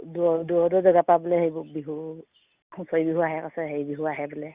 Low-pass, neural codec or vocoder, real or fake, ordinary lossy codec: 3.6 kHz; none; real; Opus, 64 kbps